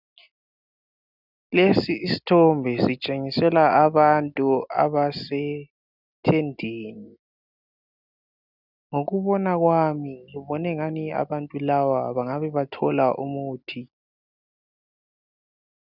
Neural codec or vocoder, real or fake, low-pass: none; real; 5.4 kHz